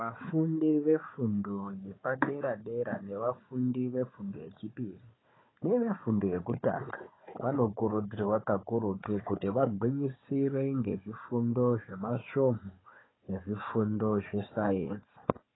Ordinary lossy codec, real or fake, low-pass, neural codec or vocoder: AAC, 16 kbps; fake; 7.2 kHz; codec, 16 kHz, 4 kbps, FunCodec, trained on Chinese and English, 50 frames a second